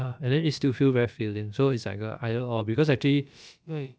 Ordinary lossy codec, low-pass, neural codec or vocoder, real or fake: none; none; codec, 16 kHz, about 1 kbps, DyCAST, with the encoder's durations; fake